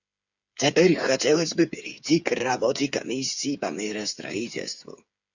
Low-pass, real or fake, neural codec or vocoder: 7.2 kHz; fake; codec, 16 kHz, 8 kbps, FreqCodec, smaller model